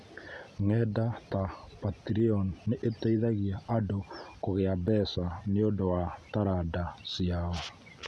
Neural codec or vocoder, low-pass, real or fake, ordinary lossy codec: none; none; real; none